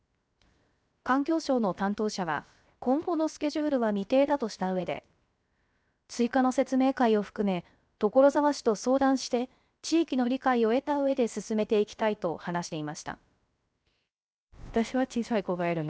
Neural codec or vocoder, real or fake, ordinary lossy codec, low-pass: codec, 16 kHz, 0.7 kbps, FocalCodec; fake; none; none